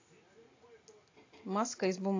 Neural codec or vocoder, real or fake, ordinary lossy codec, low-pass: none; real; AAC, 32 kbps; 7.2 kHz